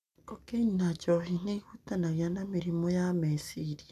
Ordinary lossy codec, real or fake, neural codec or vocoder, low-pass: none; real; none; 14.4 kHz